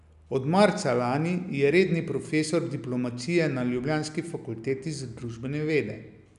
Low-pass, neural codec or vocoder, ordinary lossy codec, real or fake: 10.8 kHz; none; none; real